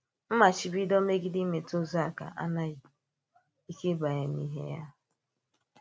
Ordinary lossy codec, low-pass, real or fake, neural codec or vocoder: none; none; real; none